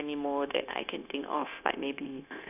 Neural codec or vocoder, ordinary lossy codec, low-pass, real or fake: codec, 16 kHz, 0.9 kbps, LongCat-Audio-Codec; none; 3.6 kHz; fake